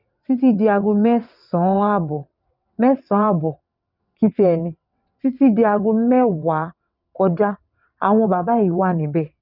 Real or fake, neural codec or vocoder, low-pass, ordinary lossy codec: fake; vocoder, 22.05 kHz, 80 mel bands, WaveNeXt; 5.4 kHz; none